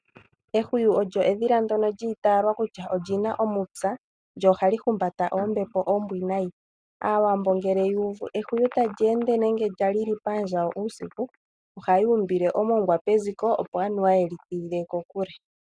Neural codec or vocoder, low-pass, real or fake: none; 9.9 kHz; real